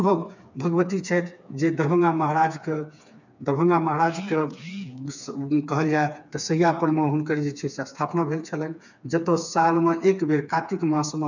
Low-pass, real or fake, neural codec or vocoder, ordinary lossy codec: 7.2 kHz; fake; codec, 16 kHz, 4 kbps, FreqCodec, smaller model; none